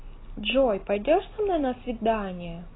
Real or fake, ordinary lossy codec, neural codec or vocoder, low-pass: real; AAC, 16 kbps; none; 7.2 kHz